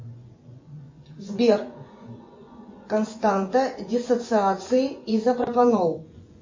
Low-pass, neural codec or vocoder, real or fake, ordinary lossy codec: 7.2 kHz; vocoder, 24 kHz, 100 mel bands, Vocos; fake; MP3, 32 kbps